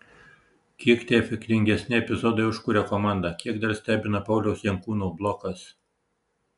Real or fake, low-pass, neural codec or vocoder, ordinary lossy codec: real; 10.8 kHz; none; MP3, 96 kbps